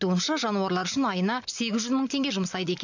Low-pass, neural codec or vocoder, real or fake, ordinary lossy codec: 7.2 kHz; none; real; none